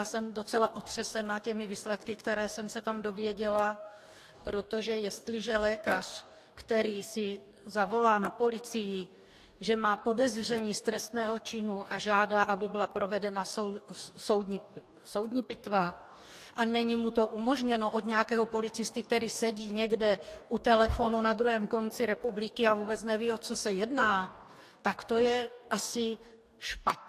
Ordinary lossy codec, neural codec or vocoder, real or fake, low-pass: AAC, 64 kbps; codec, 44.1 kHz, 2.6 kbps, DAC; fake; 14.4 kHz